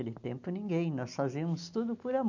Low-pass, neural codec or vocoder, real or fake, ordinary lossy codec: 7.2 kHz; none; real; none